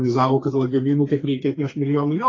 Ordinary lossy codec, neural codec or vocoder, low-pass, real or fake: AAC, 32 kbps; codec, 32 kHz, 1.9 kbps, SNAC; 7.2 kHz; fake